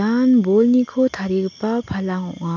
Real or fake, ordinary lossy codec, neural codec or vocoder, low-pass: real; none; none; 7.2 kHz